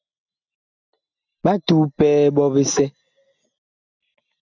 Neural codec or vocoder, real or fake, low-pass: none; real; 7.2 kHz